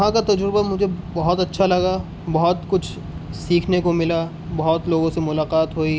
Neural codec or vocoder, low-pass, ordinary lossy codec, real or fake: none; none; none; real